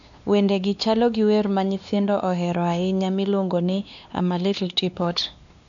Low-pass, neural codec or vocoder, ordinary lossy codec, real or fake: 7.2 kHz; codec, 16 kHz, 2 kbps, X-Codec, WavLM features, trained on Multilingual LibriSpeech; none; fake